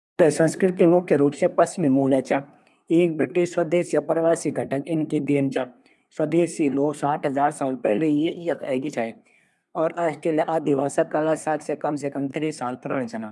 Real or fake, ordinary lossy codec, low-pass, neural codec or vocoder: fake; none; none; codec, 24 kHz, 1 kbps, SNAC